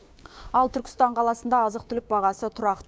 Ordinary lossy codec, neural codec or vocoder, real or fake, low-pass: none; codec, 16 kHz, 6 kbps, DAC; fake; none